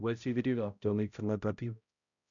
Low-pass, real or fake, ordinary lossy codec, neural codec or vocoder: 7.2 kHz; fake; none; codec, 16 kHz, 0.5 kbps, X-Codec, HuBERT features, trained on balanced general audio